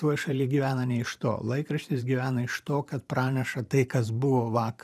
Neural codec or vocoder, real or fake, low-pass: vocoder, 44.1 kHz, 128 mel bands every 512 samples, BigVGAN v2; fake; 14.4 kHz